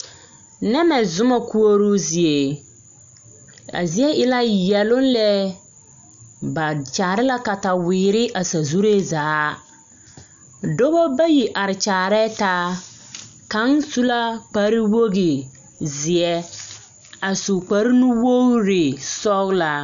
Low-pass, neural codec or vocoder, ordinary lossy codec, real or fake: 7.2 kHz; none; MP3, 64 kbps; real